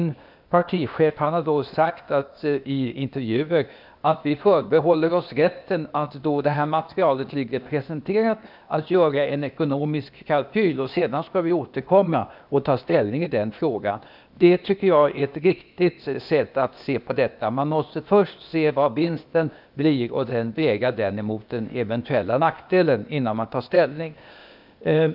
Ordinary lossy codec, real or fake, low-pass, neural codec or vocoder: none; fake; 5.4 kHz; codec, 16 kHz, 0.8 kbps, ZipCodec